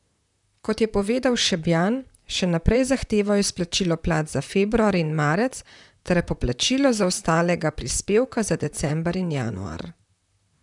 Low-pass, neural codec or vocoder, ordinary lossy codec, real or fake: 10.8 kHz; vocoder, 44.1 kHz, 128 mel bands, Pupu-Vocoder; none; fake